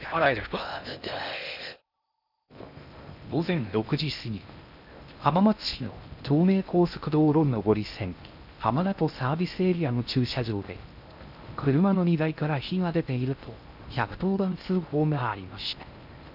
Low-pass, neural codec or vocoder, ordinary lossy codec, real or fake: 5.4 kHz; codec, 16 kHz in and 24 kHz out, 0.6 kbps, FocalCodec, streaming, 2048 codes; none; fake